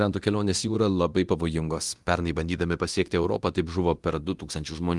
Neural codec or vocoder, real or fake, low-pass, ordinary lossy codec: codec, 24 kHz, 0.9 kbps, DualCodec; fake; 10.8 kHz; Opus, 24 kbps